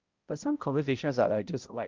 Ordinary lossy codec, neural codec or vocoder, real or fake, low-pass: Opus, 32 kbps; codec, 16 kHz, 0.5 kbps, X-Codec, HuBERT features, trained on balanced general audio; fake; 7.2 kHz